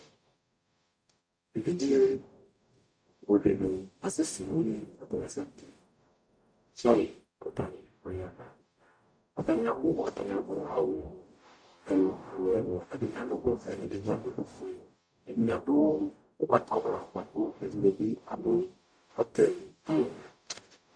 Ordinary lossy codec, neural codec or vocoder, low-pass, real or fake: MP3, 64 kbps; codec, 44.1 kHz, 0.9 kbps, DAC; 9.9 kHz; fake